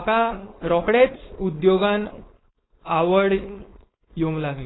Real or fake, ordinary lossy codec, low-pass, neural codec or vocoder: fake; AAC, 16 kbps; 7.2 kHz; codec, 16 kHz, 4.8 kbps, FACodec